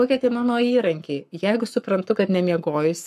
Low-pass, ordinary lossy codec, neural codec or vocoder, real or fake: 14.4 kHz; MP3, 96 kbps; codec, 44.1 kHz, 7.8 kbps, Pupu-Codec; fake